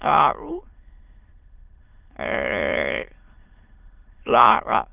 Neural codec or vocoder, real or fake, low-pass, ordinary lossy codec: autoencoder, 22.05 kHz, a latent of 192 numbers a frame, VITS, trained on many speakers; fake; 3.6 kHz; Opus, 24 kbps